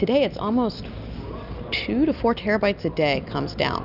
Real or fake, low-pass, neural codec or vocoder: real; 5.4 kHz; none